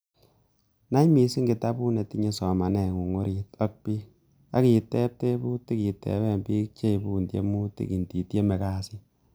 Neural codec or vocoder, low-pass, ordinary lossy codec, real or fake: none; none; none; real